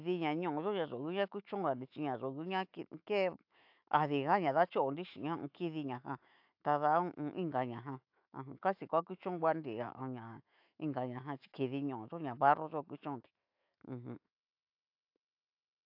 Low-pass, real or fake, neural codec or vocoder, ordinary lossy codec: 5.4 kHz; fake; autoencoder, 48 kHz, 128 numbers a frame, DAC-VAE, trained on Japanese speech; AAC, 48 kbps